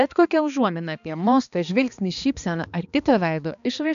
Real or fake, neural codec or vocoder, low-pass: fake; codec, 16 kHz, 2 kbps, X-Codec, HuBERT features, trained on balanced general audio; 7.2 kHz